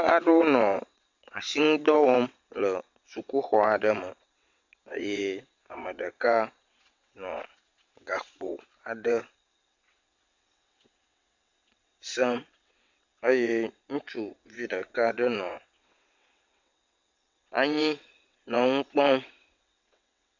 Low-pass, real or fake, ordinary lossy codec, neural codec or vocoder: 7.2 kHz; fake; MP3, 48 kbps; vocoder, 22.05 kHz, 80 mel bands, WaveNeXt